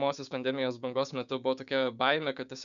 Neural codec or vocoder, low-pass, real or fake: codec, 16 kHz, 6 kbps, DAC; 7.2 kHz; fake